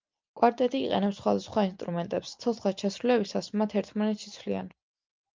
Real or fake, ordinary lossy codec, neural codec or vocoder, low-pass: real; Opus, 24 kbps; none; 7.2 kHz